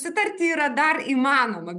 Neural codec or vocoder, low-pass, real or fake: none; 10.8 kHz; real